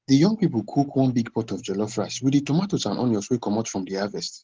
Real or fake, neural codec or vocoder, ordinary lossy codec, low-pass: real; none; Opus, 16 kbps; 7.2 kHz